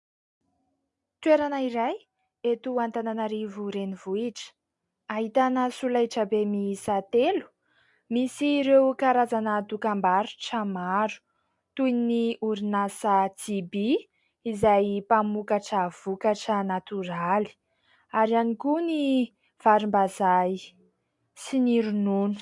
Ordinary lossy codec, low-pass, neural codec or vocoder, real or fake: MP3, 64 kbps; 10.8 kHz; none; real